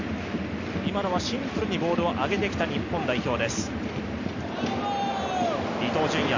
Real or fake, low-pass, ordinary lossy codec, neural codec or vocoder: real; 7.2 kHz; none; none